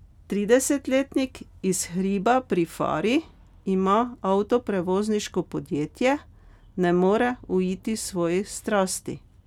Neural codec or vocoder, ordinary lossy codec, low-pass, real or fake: none; none; 19.8 kHz; real